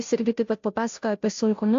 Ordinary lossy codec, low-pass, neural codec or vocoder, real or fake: AAC, 48 kbps; 7.2 kHz; codec, 16 kHz, 0.5 kbps, FunCodec, trained on Chinese and English, 25 frames a second; fake